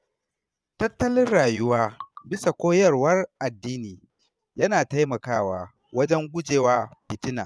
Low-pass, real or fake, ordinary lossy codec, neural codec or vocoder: none; fake; none; vocoder, 22.05 kHz, 80 mel bands, Vocos